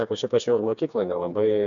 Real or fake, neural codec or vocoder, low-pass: fake; codec, 16 kHz, 2 kbps, FreqCodec, smaller model; 7.2 kHz